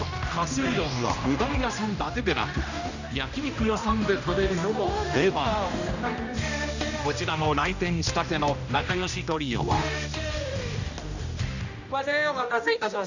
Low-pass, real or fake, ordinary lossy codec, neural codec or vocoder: 7.2 kHz; fake; none; codec, 16 kHz, 1 kbps, X-Codec, HuBERT features, trained on general audio